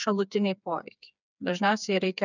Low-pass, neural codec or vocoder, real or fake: 7.2 kHz; codec, 16 kHz, 4 kbps, FreqCodec, smaller model; fake